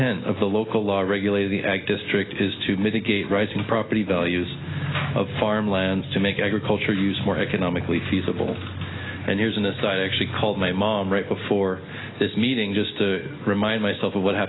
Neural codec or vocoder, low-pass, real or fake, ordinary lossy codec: none; 7.2 kHz; real; AAC, 16 kbps